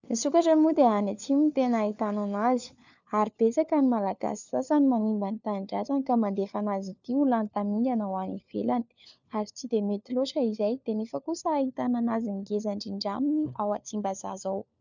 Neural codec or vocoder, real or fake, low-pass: codec, 16 kHz, 4 kbps, FunCodec, trained on LibriTTS, 50 frames a second; fake; 7.2 kHz